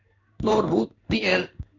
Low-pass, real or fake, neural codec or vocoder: 7.2 kHz; fake; codec, 16 kHz in and 24 kHz out, 1 kbps, XY-Tokenizer